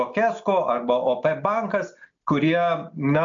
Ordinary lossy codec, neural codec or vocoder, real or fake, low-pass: AAC, 64 kbps; none; real; 7.2 kHz